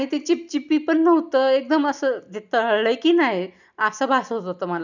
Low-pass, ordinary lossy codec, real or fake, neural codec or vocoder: 7.2 kHz; none; real; none